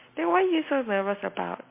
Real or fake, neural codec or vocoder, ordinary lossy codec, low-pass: real; none; MP3, 24 kbps; 3.6 kHz